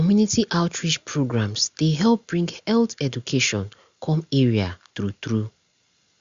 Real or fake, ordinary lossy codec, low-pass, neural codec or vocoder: real; Opus, 64 kbps; 7.2 kHz; none